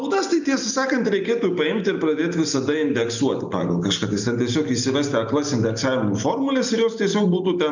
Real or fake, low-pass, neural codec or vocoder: real; 7.2 kHz; none